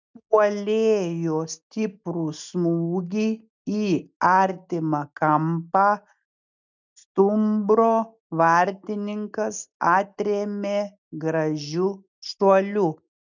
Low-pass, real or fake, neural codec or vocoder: 7.2 kHz; real; none